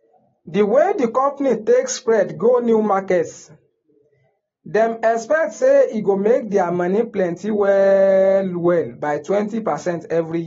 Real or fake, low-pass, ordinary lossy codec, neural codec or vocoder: real; 7.2 kHz; AAC, 24 kbps; none